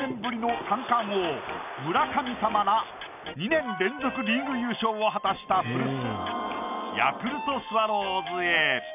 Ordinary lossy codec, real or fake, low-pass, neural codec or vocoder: none; real; 3.6 kHz; none